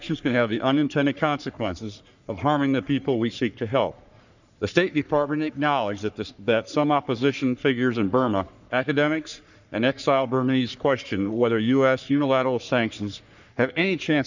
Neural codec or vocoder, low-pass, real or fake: codec, 44.1 kHz, 3.4 kbps, Pupu-Codec; 7.2 kHz; fake